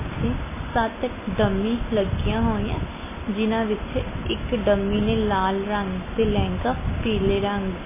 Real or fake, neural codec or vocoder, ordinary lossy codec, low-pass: real; none; MP3, 16 kbps; 3.6 kHz